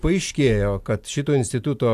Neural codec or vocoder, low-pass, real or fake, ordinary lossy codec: none; 14.4 kHz; real; AAC, 96 kbps